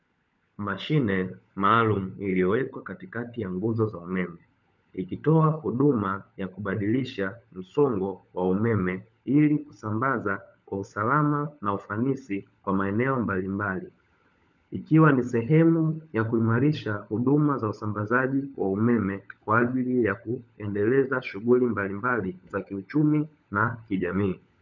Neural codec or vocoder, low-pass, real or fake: codec, 16 kHz, 16 kbps, FunCodec, trained on LibriTTS, 50 frames a second; 7.2 kHz; fake